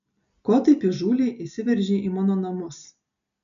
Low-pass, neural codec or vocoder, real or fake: 7.2 kHz; none; real